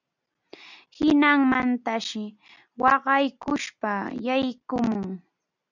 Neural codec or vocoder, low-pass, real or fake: none; 7.2 kHz; real